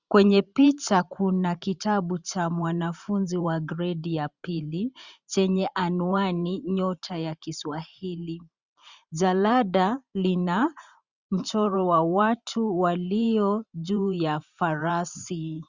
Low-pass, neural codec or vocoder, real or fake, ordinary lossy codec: 7.2 kHz; vocoder, 44.1 kHz, 128 mel bands every 512 samples, BigVGAN v2; fake; Opus, 64 kbps